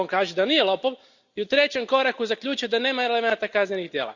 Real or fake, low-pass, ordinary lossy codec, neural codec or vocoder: real; 7.2 kHz; Opus, 64 kbps; none